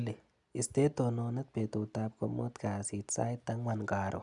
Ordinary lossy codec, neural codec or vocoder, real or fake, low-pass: none; none; real; 10.8 kHz